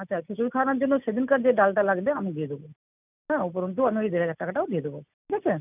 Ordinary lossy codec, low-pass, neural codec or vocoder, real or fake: none; 3.6 kHz; none; real